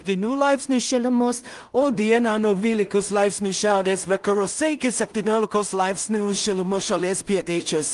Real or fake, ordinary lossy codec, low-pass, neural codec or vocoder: fake; Opus, 32 kbps; 10.8 kHz; codec, 16 kHz in and 24 kHz out, 0.4 kbps, LongCat-Audio-Codec, two codebook decoder